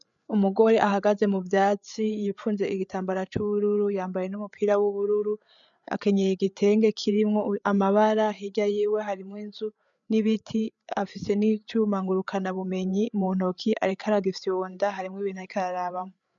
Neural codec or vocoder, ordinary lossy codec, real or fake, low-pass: codec, 16 kHz, 8 kbps, FreqCodec, larger model; MP3, 96 kbps; fake; 7.2 kHz